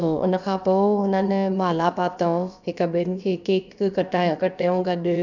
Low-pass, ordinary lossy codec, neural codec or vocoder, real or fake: 7.2 kHz; none; codec, 16 kHz, about 1 kbps, DyCAST, with the encoder's durations; fake